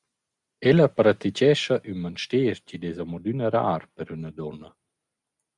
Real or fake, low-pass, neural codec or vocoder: real; 10.8 kHz; none